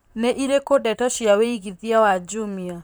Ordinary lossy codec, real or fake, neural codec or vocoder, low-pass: none; fake; vocoder, 44.1 kHz, 128 mel bands, Pupu-Vocoder; none